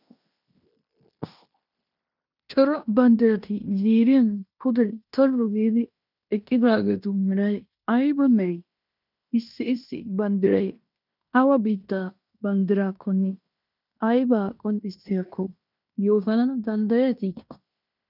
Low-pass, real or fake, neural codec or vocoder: 5.4 kHz; fake; codec, 16 kHz in and 24 kHz out, 0.9 kbps, LongCat-Audio-Codec, fine tuned four codebook decoder